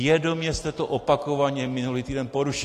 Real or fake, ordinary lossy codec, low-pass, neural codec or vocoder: real; AAC, 48 kbps; 14.4 kHz; none